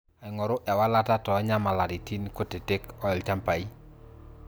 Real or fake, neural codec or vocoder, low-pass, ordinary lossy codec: real; none; none; none